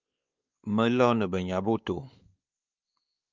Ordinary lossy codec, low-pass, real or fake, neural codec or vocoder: Opus, 32 kbps; 7.2 kHz; fake; codec, 16 kHz, 4 kbps, X-Codec, WavLM features, trained on Multilingual LibriSpeech